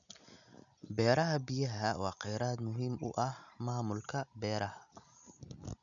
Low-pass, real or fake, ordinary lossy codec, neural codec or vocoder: 7.2 kHz; real; none; none